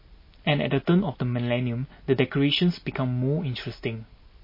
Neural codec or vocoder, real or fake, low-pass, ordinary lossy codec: none; real; 5.4 kHz; MP3, 24 kbps